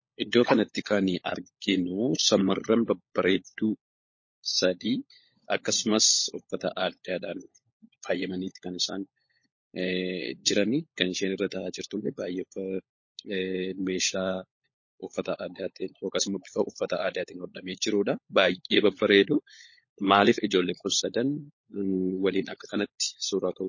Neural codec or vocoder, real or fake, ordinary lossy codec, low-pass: codec, 16 kHz, 16 kbps, FunCodec, trained on LibriTTS, 50 frames a second; fake; MP3, 32 kbps; 7.2 kHz